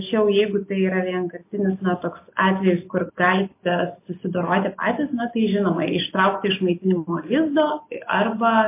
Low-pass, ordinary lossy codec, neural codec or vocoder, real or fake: 3.6 kHz; MP3, 24 kbps; none; real